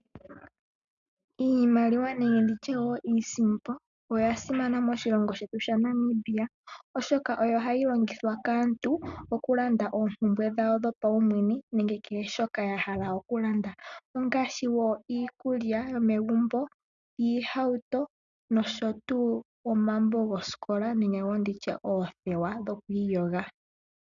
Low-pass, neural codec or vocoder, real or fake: 7.2 kHz; none; real